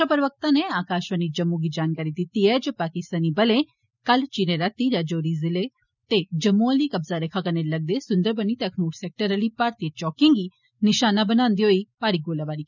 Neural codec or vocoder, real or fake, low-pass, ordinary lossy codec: none; real; 7.2 kHz; none